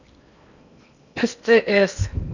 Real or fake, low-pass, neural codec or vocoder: fake; 7.2 kHz; codec, 16 kHz in and 24 kHz out, 0.8 kbps, FocalCodec, streaming, 65536 codes